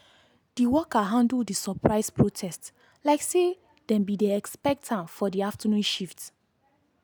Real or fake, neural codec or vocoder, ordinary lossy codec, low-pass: real; none; none; none